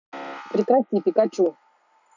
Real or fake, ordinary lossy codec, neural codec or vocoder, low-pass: real; none; none; 7.2 kHz